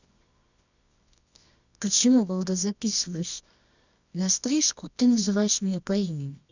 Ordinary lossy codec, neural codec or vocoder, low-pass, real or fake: none; codec, 24 kHz, 0.9 kbps, WavTokenizer, medium music audio release; 7.2 kHz; fake